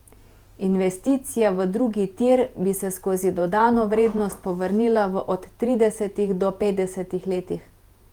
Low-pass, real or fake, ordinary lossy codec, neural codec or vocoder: 19.8 kHz; fake; Opus, 32 kbps; vocoder, 48 kHz, 128 mel bands, Vocos